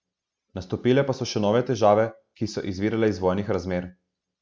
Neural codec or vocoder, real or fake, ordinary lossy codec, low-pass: none; real; none; none